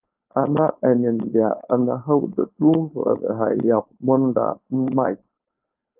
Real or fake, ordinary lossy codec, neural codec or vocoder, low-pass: fake; Opus, 32 kbps; codec, 16 kHz, 4.8 kbps, FACodec; 3.6 kHz